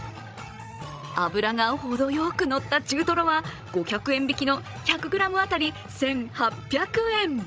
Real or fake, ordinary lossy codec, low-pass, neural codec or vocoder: fake; none; none; codec, 16 kHz, 16 kbps, FreqCodec, larger model